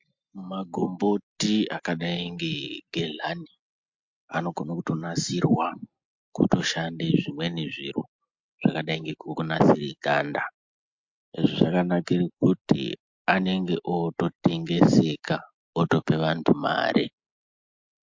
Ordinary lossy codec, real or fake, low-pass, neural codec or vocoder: MP3, 48 kbps; real; 7.2 kHz; none